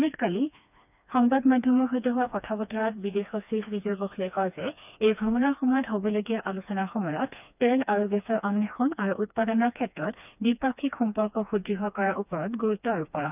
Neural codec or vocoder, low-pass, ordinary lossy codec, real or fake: codec, 16 kHz, 2 kbps, FreqCodec, smaller model; 3.6 kHz; none; fake